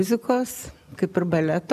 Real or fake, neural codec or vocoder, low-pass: real; none; 14.4 kHz